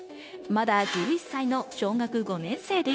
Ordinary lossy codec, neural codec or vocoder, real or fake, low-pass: none; codec, 16 kHz, 0.9 kbps, LongCat-Audio-Codec; fake; none